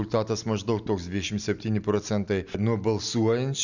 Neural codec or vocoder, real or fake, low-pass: none; real; 7.2 kHz